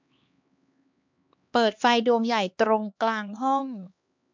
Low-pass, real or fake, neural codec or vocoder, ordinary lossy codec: 7.2 kHz; fake; codec, 16 kHz, 2 kbps, X-Codec, HuBERT features, trained on LibriSpeech; MP3, 64 kbps